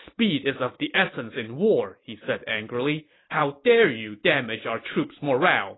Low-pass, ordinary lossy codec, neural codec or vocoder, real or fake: 7.2 kHz; AAC, 16 kbps; none; real